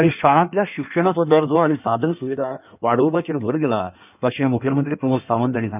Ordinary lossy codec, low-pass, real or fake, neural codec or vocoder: none; 3.6 kHz; fake; codec, 16 kHz in and 24 kHz out, 1.1 kbps, FireRedTTS-2 codec